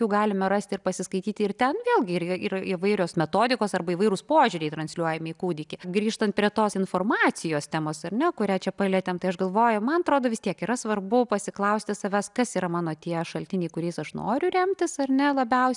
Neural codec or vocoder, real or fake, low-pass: none; real; 10.8 kHz